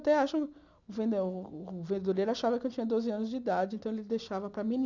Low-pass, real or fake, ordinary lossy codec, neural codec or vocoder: 7.2 kHz; real; none; none